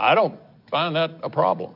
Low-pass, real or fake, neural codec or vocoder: 5.4 kHz; real; none